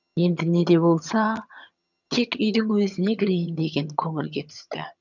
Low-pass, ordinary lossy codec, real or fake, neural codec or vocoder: 7.2 kHz; none; fake; vocoder, 22.05 kHz, 80 mel bands, HiFi-GAN